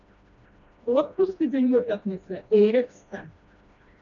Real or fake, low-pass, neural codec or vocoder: fake; 7.2 kHz; codec, 16 kHz, 1 kbps, FreqCodec, smaller model